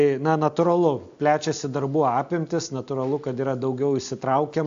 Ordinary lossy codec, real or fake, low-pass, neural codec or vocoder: AAC, 64 kbps; real; 7.2 kHz; none